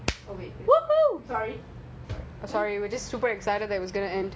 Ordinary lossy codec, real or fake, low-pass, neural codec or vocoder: none; real; none; none